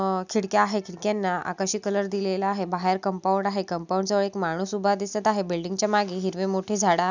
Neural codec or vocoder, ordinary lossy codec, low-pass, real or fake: none; none; 7.2 kHz; real